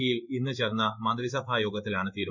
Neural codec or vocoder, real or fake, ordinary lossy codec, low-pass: codec, 16 kHz in and 24 kHz out, 1 kbps, XY-Tokenizer; fake; none; 7.2 kHz